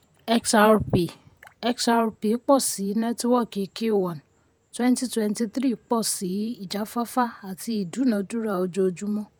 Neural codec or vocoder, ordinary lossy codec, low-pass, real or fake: vocoder, 48 kHz, 128 mel bands, Vocos; none; none; fake